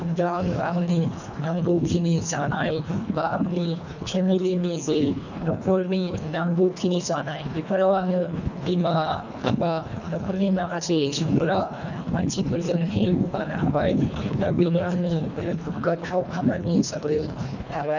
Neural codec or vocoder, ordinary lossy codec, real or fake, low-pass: codec, 24 kHz, 1.5 kbps, HILCodec; none; fake; 7.2 kHz